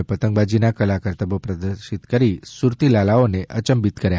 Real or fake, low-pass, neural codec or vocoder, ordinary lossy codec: real; none; none; none